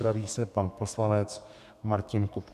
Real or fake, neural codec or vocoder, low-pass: fake; codec, 32 kHz, 1.9 kbps, SNAC; 14.4 kHz